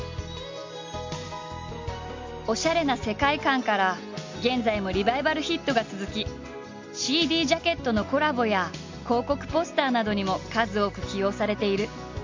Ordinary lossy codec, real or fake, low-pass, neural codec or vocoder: MP3, 48 kbps; real; 7.2 kHz; none